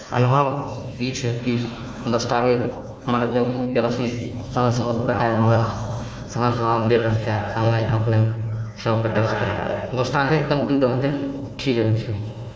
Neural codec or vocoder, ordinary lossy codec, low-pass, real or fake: codec, 16 kHz, 1 kbps, FunCodec, trained on Chinese and English, 50 frames a second; none; none; fake